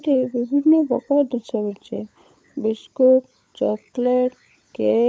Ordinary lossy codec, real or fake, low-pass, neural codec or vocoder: none; fake; none; codec, 16 kHz, 16 kbps, FunCodec, trained on LibriTTS, 50 frames a second